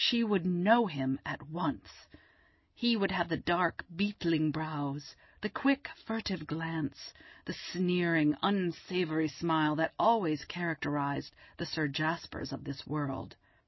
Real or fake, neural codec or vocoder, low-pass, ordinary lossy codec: real; none; 7.2 kHz; MP3, 24 kbps